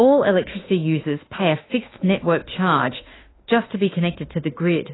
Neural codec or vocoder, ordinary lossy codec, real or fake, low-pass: autoencoder, 48 kHz, 32 numbers a frame, DAC-VAE, trained on Japanese speech; AAC, 16 kbps; fake; 7.2 kHz